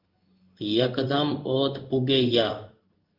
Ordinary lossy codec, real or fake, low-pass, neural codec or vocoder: Opus, 24 kbps; fake; 5.4 kHz; codec, 16 kHz in and 24 kHz out, 1 kbps, XY-Tokenizer